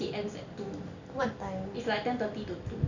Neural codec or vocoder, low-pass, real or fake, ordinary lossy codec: none; 7.2 kHz; real; none